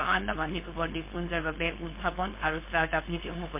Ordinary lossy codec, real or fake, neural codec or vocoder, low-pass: MP3, 24 kbps; fake; codec, 16 kHz, 4.8 kbps, FACodec; 3.6 kHz